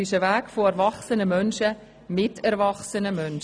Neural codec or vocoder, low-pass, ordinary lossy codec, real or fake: none; 9.9 kHz; none; real